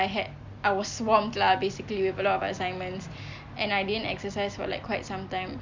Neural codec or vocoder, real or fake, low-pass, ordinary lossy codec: none; real; 7.2 kHz; MP3, 64 kbps